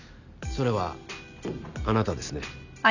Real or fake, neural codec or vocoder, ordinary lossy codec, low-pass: real; none; none; 7.2 kHz